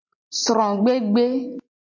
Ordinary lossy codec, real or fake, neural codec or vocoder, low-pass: MP3, 48 kbps; real; none; 7.2 kHz